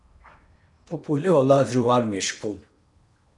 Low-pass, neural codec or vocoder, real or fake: 10.8 kHz; codec, 16 kHz in and 24 kHz out, 0.8 kbps, FocalCodec, streaming, 65536 codes; fake